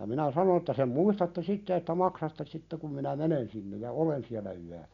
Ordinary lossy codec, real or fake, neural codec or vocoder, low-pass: none; real; none; 7.2 kHz